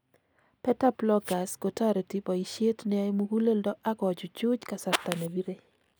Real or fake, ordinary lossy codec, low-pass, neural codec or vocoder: real; none; none; none